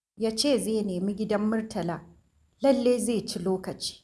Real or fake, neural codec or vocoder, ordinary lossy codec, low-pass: real; none; none; none